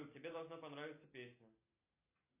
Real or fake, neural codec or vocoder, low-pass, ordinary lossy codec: real; none; 3.6 kHz; AAC, 24 kbps